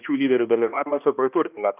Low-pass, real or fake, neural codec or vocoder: 3.6 kHz; fake; codec, 16 kHz, 1 kbps, X-Codec, HuBERT features, trained on balanced general audio